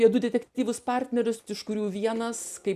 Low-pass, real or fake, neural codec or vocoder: 14.4 kHz; real; none